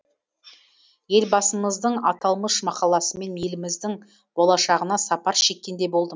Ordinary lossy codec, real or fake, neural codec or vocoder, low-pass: none; real; none; none